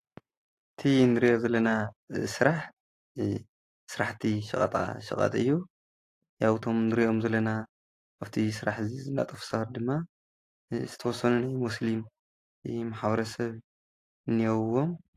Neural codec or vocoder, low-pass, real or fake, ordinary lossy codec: none; 14.4 kHz; real; AAC, 48 kbps